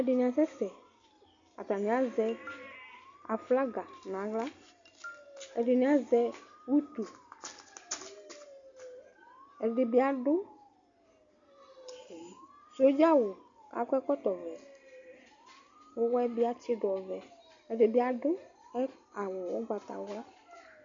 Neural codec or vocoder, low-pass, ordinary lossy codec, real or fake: none; 7.2 kHz; MP3, 64 kbps; real